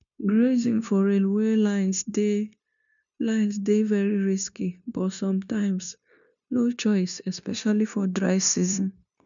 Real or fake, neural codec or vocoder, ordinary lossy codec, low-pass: fake; codec, 16 kHz, 0.9 kbps, LongCat-Audio-Codec; MP3, 96 kbps; 7.2 kHz